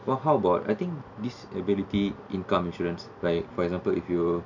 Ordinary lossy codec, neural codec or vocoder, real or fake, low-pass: none; none; real; 7.2 kHz